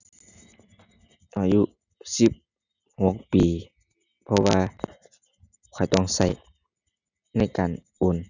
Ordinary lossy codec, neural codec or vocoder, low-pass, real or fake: none; none; 7.2 kHz; real